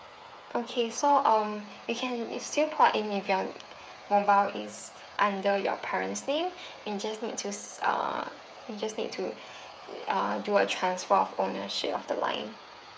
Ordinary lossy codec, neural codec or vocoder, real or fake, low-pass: none; codec, 16 kHz, 8 kbps, FreqCodec, smaller model; fake; none